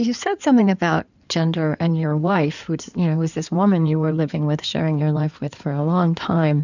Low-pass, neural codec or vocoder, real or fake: 7.2 kHz; codec, 16 kHz in and 24 kHz out, 2.2 kbps, FireRedTTS-2 codec; fake